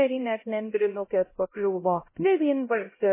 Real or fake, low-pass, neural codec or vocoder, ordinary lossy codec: fake; 3.6 kHz; codec, 16 kHz, 0.5 kbps, X-Codec, HuBERT features, trained on LibriSpeech; MP3, 16 kbps